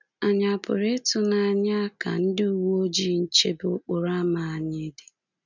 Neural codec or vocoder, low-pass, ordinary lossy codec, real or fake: none; 7.2 kHz; none; real